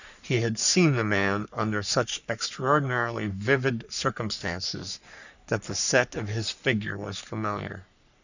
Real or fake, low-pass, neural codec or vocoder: fake; 7.2 kHz; codec, 44.1 kHz, 3.4 kbps, Pupu-Codec